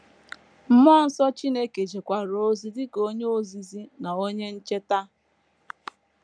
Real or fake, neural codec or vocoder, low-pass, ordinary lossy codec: real; none; none; none